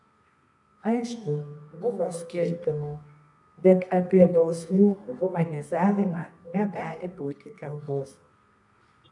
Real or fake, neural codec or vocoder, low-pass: fake; codec, 24 kHz, 0.9 kbps, WavTokenizer, medium music audio release; 10.8 kHz